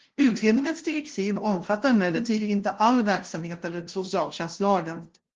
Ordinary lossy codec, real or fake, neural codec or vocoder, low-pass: Opus, 16 kbps; fake; codec, 16 kHz, 0.5 kbps, FunCodec, trained on LibriTTS, 25 frames a second; 7.2 kHz